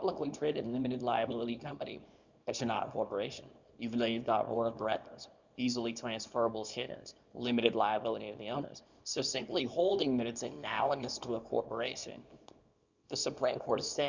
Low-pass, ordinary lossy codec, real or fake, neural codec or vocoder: 7.2 kHz; Opus, 64 kbps; fake; codec, 24 kHz, 0.9 kbps, WavTokenizer, small release